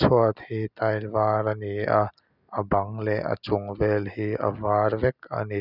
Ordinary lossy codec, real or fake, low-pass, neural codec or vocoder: none; real; 5.4 kHz; none